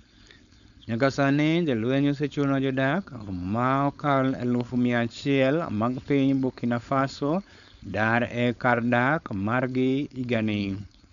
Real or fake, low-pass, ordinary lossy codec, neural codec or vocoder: fake; 7.2 kHz; none; codec, 16 kHz, 4.8 kbps, FACodec